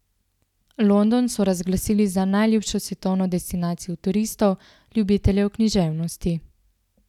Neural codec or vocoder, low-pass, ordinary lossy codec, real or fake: none; 19.8 kHz; none; real